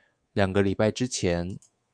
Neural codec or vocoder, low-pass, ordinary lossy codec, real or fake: codec, 24 kHz, 3.1 kbps, DualCodec; 9.9 kHz; Opus, 64 kbps; fake